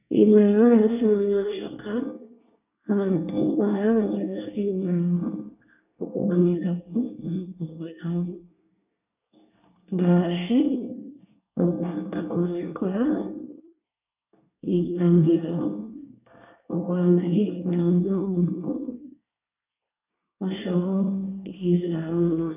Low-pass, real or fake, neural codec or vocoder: 3.6 kHz; fake; codec, 24 kHz, 1 kbps, SNAC